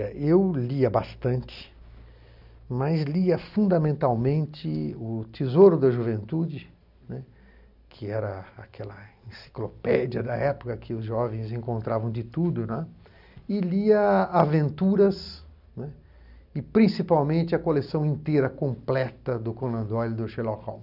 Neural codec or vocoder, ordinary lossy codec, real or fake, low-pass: none; none; real; 5.4 kHz